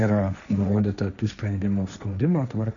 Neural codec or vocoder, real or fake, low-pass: codec, 16 kHz, 1.1 kbps, Voila-Tokenizer; fake; 7.2 kHz